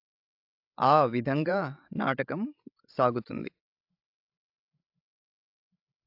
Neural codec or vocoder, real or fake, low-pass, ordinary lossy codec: codec, 16 kHz, 16 kbps, FreqCodec, larger model; fake; 5.4 kHz; AAC, 48 kbps